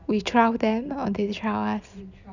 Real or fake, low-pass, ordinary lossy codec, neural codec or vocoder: real; 7.2 kHz; none; none